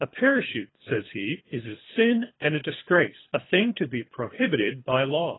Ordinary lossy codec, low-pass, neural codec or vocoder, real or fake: AAC, 16 kbps; 7.2 kHz; codec, 24 kHz, 3 kbps, HILCodec; fake